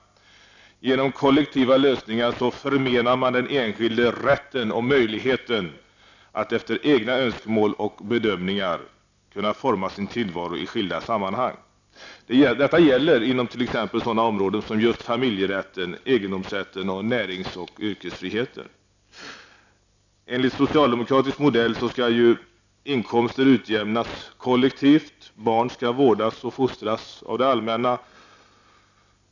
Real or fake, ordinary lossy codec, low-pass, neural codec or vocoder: real; none; 7.2 kHz; none